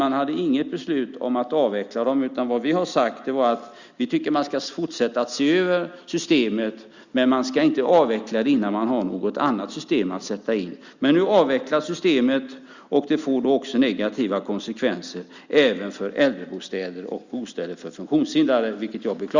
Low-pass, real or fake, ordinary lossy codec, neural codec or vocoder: 7.2 kHz; real; Opus, 64 kbps; none